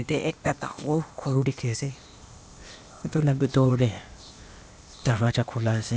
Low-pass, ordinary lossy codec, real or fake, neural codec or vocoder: none; none; fake; codec, 16 kHz, 0.8 kbps, ZipCodec